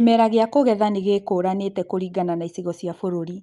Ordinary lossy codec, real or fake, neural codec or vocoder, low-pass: Opus, 32 kbps; real; none; 10.8 kHz